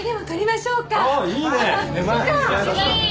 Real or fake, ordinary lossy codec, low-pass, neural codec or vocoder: real; none; none; none